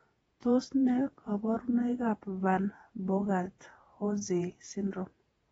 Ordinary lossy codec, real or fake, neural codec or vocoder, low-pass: AAC, 24 kbps; fake; vocoder, 48 kHz, 128 mel bands, Vocos; 19.8 kHz